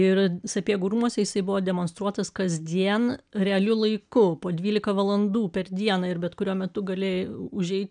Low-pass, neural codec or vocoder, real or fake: 9.9 kHz; none; real